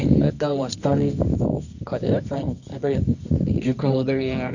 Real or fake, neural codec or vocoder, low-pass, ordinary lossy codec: fake; codec, 24 kHz, 0.9 kbps, WavTokenizer, medium music audio release; 7.2 kHz; none